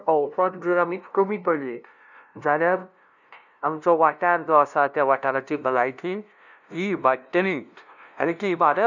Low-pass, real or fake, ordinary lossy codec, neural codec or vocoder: 7.2 kHz; fake; none; codec, 16 kHz, 0.5 kbps, FunCodec, trained on LibriTTS, 25 frames a second